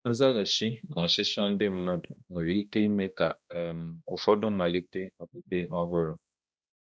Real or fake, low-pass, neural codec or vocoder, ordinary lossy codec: fake; none; codec, 16 kHz, 1 kbps, X-Codec, HuBERT features, trained on balanced general audio; none